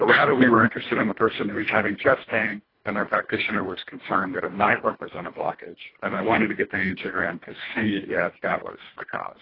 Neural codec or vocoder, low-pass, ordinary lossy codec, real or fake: codec, 24 kHz, 1.5 kbps, HILCodec; 5.4 kHz; AAC, 24 kbps; fake